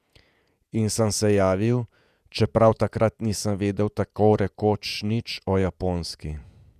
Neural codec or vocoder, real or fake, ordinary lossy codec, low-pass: vocoder, 48 kHz, 128 mel bands, Vocos; fake; none; 14.4 kHz